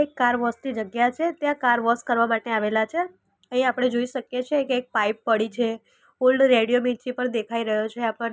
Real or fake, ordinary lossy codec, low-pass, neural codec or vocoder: real; none; none; none